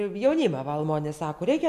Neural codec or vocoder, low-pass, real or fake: none; 14.4 kHz; real